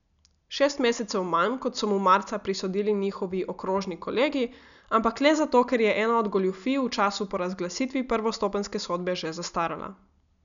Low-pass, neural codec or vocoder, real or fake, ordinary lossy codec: 7.2 kHz; none; real; none